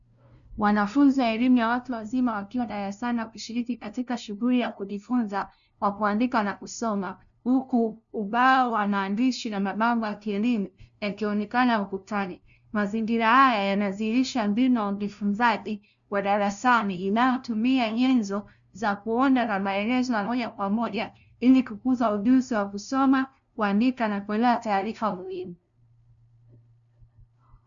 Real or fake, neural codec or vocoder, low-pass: fake; codec, 16 kHz, 0.5 kbps, FunCodec, trained on LibriTTS, 25 frames a second; 7.2 kHz